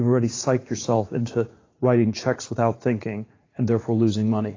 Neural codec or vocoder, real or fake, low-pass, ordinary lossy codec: vocoder, 44.1 kHz, 80 mel bands, Vocos; fake; 7.2 kHz; AAC, 32 kbps